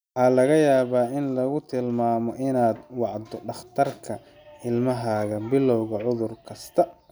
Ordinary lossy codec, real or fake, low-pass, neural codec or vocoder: none; real; none; none